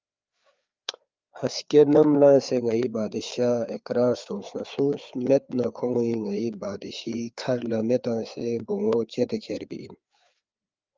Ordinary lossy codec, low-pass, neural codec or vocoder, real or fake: Opus, 24 kbps; 7.2 kHz; codec, 16 kHz, 4 kbps, FreqCodec, larger model; fake